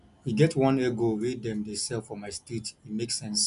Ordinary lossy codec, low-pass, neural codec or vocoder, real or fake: none; 10.8 kHz; none; real